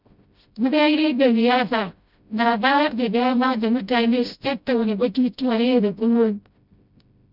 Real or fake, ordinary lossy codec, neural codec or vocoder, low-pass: fake; none; codec, 16 kHz, 0.5 kbps, FreqCodec, smaller model; 5.4 kHz